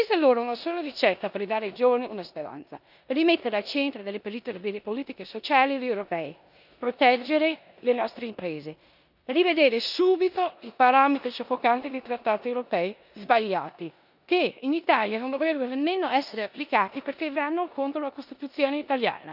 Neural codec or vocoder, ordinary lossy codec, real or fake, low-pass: codec, 16 kHz in and 24 kHz out, 0.9 kbps, LongCat-Audio-Codec, four codebook decoder; none; fake; 5.4 kHz